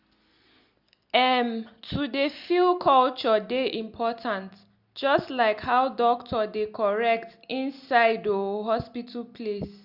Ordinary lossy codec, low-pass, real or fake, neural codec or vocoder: Opus, 64 kbps; 5.4 kHz; real; none